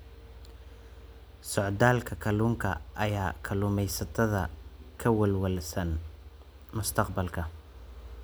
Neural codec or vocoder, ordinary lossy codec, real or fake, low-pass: none; none; real; none